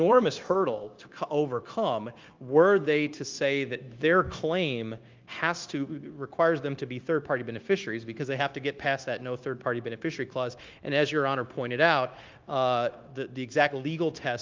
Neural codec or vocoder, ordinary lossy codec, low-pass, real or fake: codec, 16 kHz, 0.9 kbps, LongCat-Audio-Codec; Opus, 32 kbps; 7.2 kHz; fake